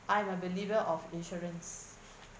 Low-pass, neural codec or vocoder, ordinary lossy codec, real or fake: none; none; none; real